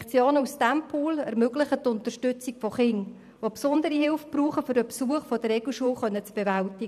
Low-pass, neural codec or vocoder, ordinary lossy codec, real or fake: 14.4 kHz; vocoder, 44.1 kHz, 128 mel bands every 512 samples, BigVGAN v2; none; fake